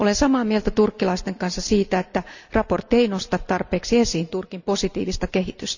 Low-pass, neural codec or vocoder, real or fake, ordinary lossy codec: 7.2 kHz; none; real; none